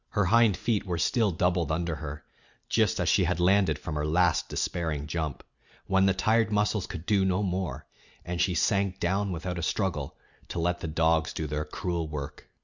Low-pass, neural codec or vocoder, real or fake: 7.2 kHz; none; real